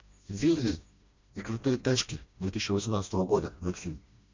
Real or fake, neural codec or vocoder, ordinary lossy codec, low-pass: fake; codec, 16 kHz, 1 kbps, FreqCodec, smaller model; MP3, 48 kbps; 7.2 kHz